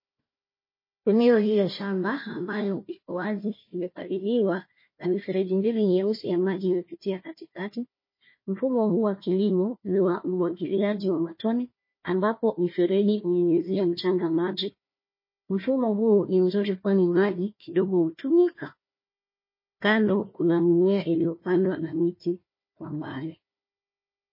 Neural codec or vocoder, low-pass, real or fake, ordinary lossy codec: codec, 16 kHz, 1 kbps, FunCodec, trained on Chinese and English, 50 frames a second; 5.4 kHz; fake; MP3, 24 kbps